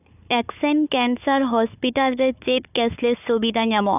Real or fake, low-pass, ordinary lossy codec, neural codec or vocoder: fake; 3.6 kHz; none; codec, 16 kHz, 4 kbps, FunCodec, trained on Chinese and English, 50 frames a second